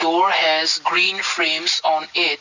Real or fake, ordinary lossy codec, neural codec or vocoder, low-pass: real; none; none; 7.2 kHz